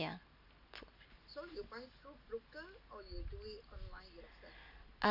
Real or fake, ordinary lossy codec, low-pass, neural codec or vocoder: real; none; 5.4 kHz; none